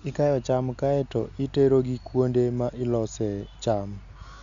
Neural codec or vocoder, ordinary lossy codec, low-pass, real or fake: none; none; 7.2 kHz; real